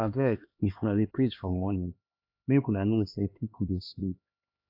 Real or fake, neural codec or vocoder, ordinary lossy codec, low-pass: fake; codec, 16 kHz, 2 kbps, X-Codec, HuBERT features, trained on LibriSpeech; AAC, 48 kbps; 5.4 kHz